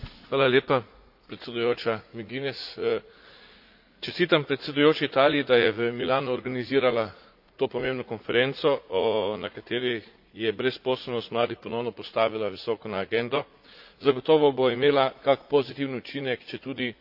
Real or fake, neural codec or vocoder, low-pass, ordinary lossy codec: fake; vocoder, 44.1 kHz, 80 mel bands, Vocos; 5.4 kHz; AAC, 48 kbps